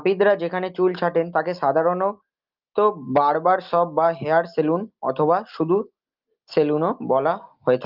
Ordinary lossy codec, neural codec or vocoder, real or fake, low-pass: Opus, 32 kbps; none; real; 5.4 kHz